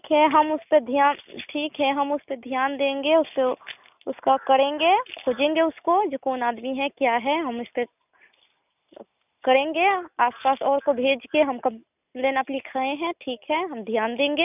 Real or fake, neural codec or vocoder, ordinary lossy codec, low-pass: real; none; none; 3.6 kHz